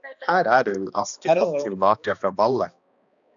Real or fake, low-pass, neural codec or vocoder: fake; 7.2 kHz; codec, 16 kHz, 2 kbps, X-Codec, HuBERT features, trained on balanced general audio